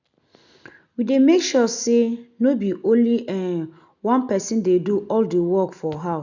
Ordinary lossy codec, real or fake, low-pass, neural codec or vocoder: none; real; 7.2 kHz; none